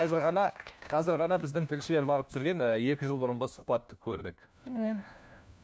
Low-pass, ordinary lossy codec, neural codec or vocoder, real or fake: none; none; codec, 16 kHz, 1 kbps, FunCodec, trained on LibriTTS, 50 frames a second; fake